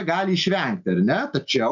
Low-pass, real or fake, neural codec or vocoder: 7.2 kHz; real; none